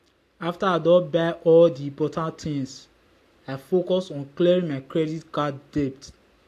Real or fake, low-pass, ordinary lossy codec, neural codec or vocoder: real; 14.4 kHz; AAC, 64 kbps; none